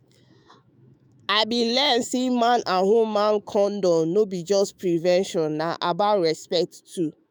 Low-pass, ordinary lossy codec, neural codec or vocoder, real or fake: none; none; autoencoder, 48 kHz, 128 numbers a frame, DAC-VAE, trained on Japanese speech; fake